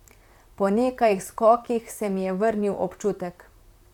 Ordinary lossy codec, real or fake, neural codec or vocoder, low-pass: none; fake; vocoder, 44.1 kHz, 128 mel bands, Pupu-Vocoder; 19.8 kHz